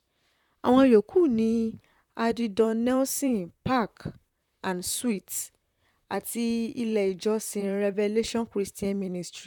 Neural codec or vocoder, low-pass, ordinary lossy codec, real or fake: vocoder, 44.1 kHz, 128 mel bands, Pupu-Vocoder; 19.8 kHz; none; fake